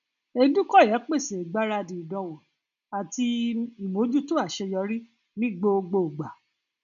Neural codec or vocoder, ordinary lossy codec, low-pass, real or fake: none; none; 7.2 kHz; real